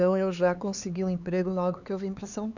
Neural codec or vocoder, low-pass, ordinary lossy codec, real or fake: codec, 16 kHz, 4 kbps, X-Codec, HuBERT features, trained on LibriSpeech; 7.2 kHz; none; fake